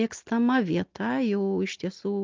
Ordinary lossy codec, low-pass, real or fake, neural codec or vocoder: Opus, 32 kbps; 7.2 kHz; real; none